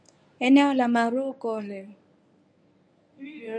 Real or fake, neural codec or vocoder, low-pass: fake; codec, 24 kHz, 0.9 kbps, WavTokenizer, medium speech release version 1; 9.9 kHz